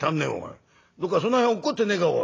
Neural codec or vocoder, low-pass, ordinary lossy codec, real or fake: none; 7.2 kHz; AAC, 32 kbps; real